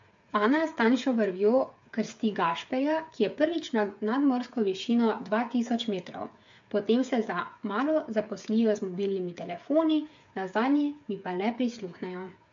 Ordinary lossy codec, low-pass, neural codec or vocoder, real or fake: MP3, 48 kbps; 7.2 kHz; codec, 16 kHz, 8 kbps, FreqCodec, smaller model; fake